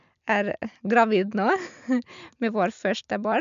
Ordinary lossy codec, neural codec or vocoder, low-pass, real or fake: none; none; 7.2 kHz; real